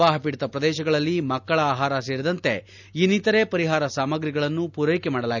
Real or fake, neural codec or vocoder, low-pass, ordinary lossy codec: real; none; 7.2 kHz; none